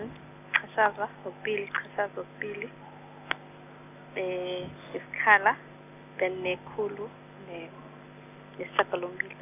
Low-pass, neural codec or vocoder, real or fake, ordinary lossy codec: 3.6 kHz; none; real; none